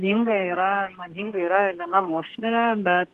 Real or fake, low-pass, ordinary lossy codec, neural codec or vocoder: fake; 14.4 kHz; Opus, 16 kbps; codec, 32 kHz, 1.9 kbps, SNAC